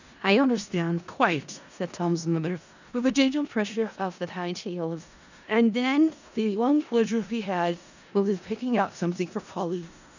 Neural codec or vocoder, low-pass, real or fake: codec, 16 kHz in and 24 kHz out, 0.4 kbps, LongCat-Audio-Codec, four codebook decoder; 7.2 kHz; fake